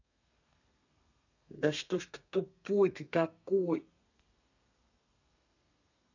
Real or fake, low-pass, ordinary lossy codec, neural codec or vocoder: fake; 7.2 kHz; none; codec, 32 kHz, 1.9 kbps, SNAC